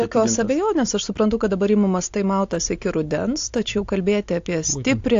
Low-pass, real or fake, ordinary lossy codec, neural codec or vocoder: 7.2 kHz; real; AAC, 48 kbps; none